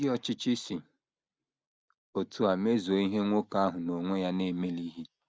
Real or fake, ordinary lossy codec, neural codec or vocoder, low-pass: real; none; none; none